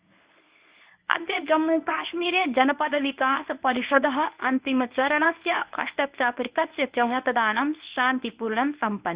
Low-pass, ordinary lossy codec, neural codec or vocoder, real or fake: 3.6 kHz; Opus, 64 kbps; codec, 24 kHz, 0.9 kbps, WavTokenizer, medium speech release version 1; fake